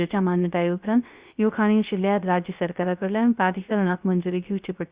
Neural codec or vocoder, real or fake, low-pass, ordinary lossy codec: codec, 16 kHz, 0.3 kbps, FocalCodec; fake; 3.6 kHz; Opus, 64 kbps